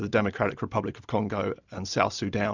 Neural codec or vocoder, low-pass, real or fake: none; 7.2 kHz; real